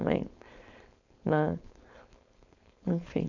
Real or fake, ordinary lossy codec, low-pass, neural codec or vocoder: fake; none; 7.2 kHz; codec, 16 kHz, 4.8 kbps, FACodec